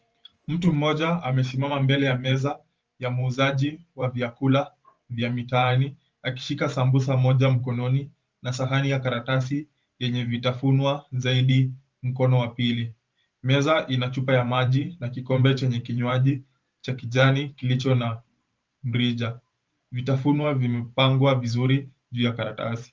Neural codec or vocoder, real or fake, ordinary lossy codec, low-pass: vocoder, 44.1 kHz, 128 mel bands every 512 samples, BigVGAN v2; fake; Opus, 24 kbps; 7.2 kHz